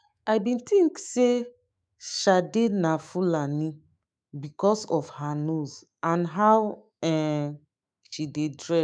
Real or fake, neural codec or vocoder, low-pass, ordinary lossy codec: fake; autoencoder, 48 kHz, 128 numbers a frame, DAC-VAE, trained on Japanese speech; 9.9 kHz; none